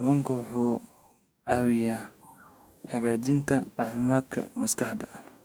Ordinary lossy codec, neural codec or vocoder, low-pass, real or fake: none; codec, 44.1 kHz, 2.6 kbps, DAC; none; fake